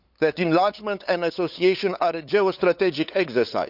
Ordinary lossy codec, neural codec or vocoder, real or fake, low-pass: none; codec, 16 kHz, 8 kbps, FunCodec, trained on LibriTTS, 25 frames a second; fake; 5.4 kHz